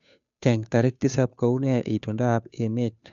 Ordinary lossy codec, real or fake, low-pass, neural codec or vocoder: MP3, 96 kbps; fake; 7.2 kHz; codec, 16 kHz, 2 kbps, FunCodec, trained on Chinese and English, 25 frames a second